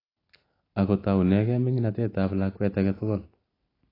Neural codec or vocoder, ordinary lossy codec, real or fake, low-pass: none; AAC, 24 kbps; real; 5.4 kHz